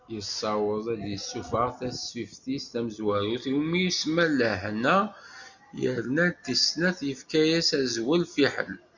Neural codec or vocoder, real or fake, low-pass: none; real; 7.2 kHz